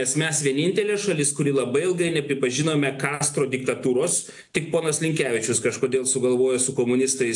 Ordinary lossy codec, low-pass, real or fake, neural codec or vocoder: AAC, 64 kbps; 10.8 kHz; real; none